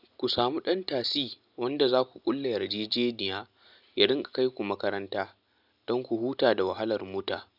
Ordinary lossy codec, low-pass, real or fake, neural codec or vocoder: none; 5.4 kHz; real; none